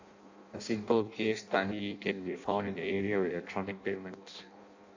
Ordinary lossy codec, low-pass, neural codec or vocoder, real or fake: AAC, 48 kbps; 7.2 kHz; codec, 16 kHz in and 24 kHz out, 0.6 kbps, FireRedTTS-2 codec; fake